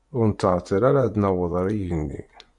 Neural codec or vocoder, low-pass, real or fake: vocoder, 24 kHz, 100 mel bands, Vocos; 10.8 kHz; fake